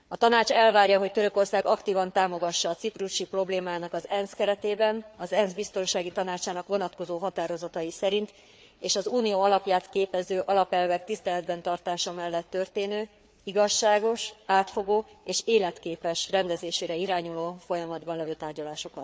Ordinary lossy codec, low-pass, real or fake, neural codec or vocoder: none; none; fake; codec, 16 kHz, 4 kbps, FreqCodec, larger model